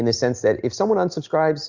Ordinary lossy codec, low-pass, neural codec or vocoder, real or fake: Opus, 64 kbps; 7.2 kHz; none; real